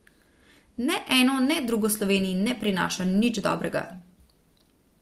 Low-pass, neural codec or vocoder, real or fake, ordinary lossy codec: 14.4 kHz; none; real; Opus, 24 kbps